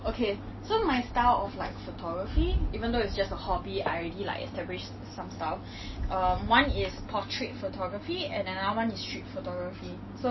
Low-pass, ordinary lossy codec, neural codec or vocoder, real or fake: 7.2 kHz; MP3, 24 kbps; none; real